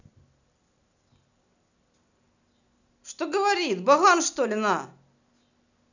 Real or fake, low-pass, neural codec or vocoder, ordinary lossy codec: real; 7.2 kHz; none; none